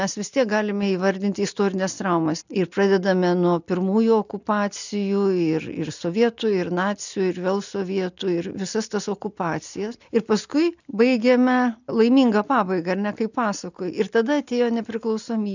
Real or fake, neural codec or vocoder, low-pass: real; none; 7.2 kHz